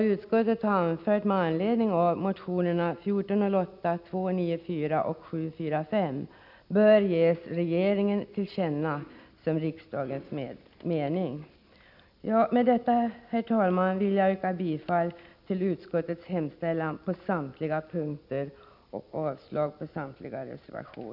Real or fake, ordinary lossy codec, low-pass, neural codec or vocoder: real; none; 5.4 kHz; none